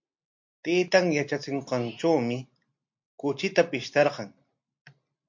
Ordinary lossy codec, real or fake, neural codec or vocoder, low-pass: MP3, 48 kbps; real; none; 7.2 kHz